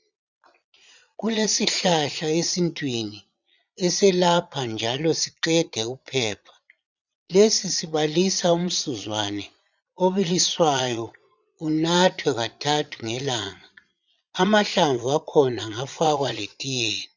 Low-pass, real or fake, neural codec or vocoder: 7.2 kHz; fake; vocoder, 22.05 kHz, 80 mel bands, Vocos